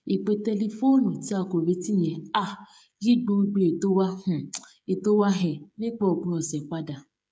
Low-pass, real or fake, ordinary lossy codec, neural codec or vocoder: none; fake; none; codec, 16 kHz, 16 kbps, FreqCodec, smaller model